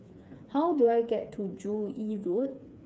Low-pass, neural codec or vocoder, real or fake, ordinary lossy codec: none; codec, 16 kHz, 4 kbps, FreqCodec, smaller model; fake; none